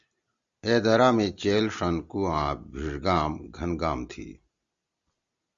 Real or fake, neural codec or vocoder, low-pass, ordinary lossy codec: real; none; 7.2 kHz; Opus, 64 kbps